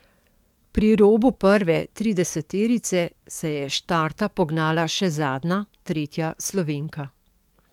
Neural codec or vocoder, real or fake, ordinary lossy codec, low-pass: codec, 44.1 kHz, 7.8 kbps, DAC; fake; MP3, 96 kbps; 19.8 kHz